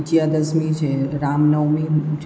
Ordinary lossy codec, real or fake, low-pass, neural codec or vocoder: none; real; none; none